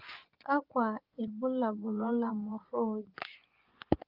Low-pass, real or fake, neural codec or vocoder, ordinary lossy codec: 5.4 kHz; fake; vocoder, 24 kHz, 100 mel bands, Vocos; Opus, 32 kbps